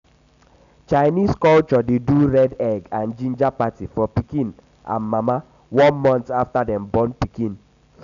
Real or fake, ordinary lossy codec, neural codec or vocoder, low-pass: real; none; none; 7.2 kHz